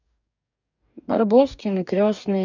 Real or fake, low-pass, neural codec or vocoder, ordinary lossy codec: fake; 7.2 kHz; codec, 44.1 kHz, 2.6 kbps, DAC; none